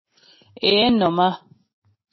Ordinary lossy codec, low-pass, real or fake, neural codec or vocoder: MP3, 24 kbps; 7.2 kHz; real; none